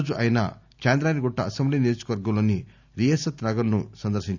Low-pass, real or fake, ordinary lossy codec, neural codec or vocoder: 7.2 kHz; real; none; none